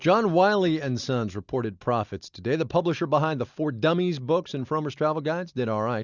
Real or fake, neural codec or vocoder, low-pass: real; none; 7.2 kHz